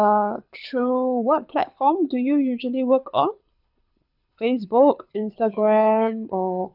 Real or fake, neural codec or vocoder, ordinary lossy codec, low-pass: fake; codec, 24 kHz, 6 kbps, HILCodec; none; 5.4 kHz